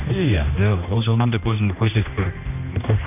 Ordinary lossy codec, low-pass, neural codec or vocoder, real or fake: none; 3.6 kHz; codec, 16 kHz, 1 kbps, X-Codec, HuBERT features, trained on general audio; fake